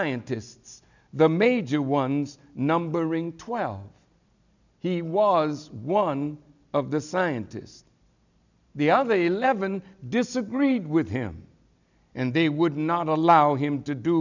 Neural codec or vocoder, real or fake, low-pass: none; real; 7.2 kHz